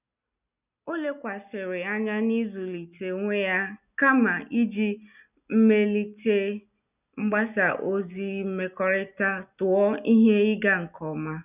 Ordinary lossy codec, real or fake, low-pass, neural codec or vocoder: none; real; 3.6 kHz; none